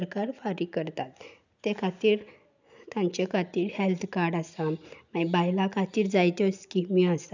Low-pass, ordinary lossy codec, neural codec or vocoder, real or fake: 7.2 kHz; none; none; real